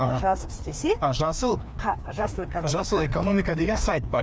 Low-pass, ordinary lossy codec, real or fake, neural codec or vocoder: none; none; fake; codec, 16 kHz, 2 kbps, FreqCodec, larger model